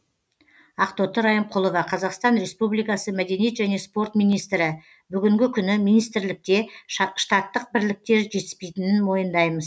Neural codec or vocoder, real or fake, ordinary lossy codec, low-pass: none; real; none; none